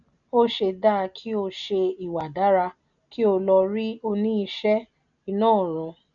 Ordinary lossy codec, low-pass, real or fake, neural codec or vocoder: none; 7.2 kHz; real; none